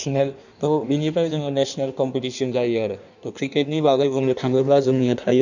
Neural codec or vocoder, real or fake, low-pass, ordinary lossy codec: codec, 16 kHz in and 24 kHz out, 1.1 kbps, FireRedTTS-2 codec; fake; 7.2 kHz; none